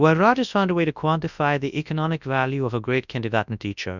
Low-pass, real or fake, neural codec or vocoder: 7.2 kHz; fake; codec, 24 kHz, 0.9 kbps, WavTokenizer, large speech release